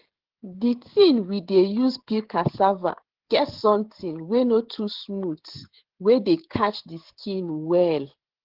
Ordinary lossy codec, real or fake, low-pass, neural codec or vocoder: Opus, 16 kbps; fake; 5.4 kHz; codec, 16 kHz, 16 kbps, FreqCodec, smaller model